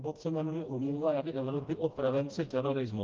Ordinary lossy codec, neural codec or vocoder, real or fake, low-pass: Opus, 24 kbps; codec, 16 kHz, 1 kbps, FreqCodec, smaller model; fake; 7.2 kHz